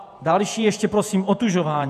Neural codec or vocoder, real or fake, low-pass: vocoder, 48 kHz, 128 mel bands, Vocos; fake; 14.4 kHz